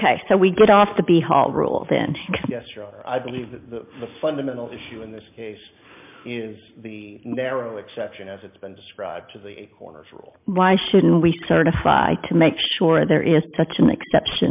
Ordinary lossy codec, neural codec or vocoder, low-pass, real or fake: MP3, 32 kbps; none; 3.6 kHz; real